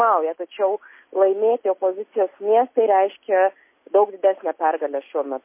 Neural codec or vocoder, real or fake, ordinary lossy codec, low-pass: none; real; MP3, 24 kbps; 3.6 kHz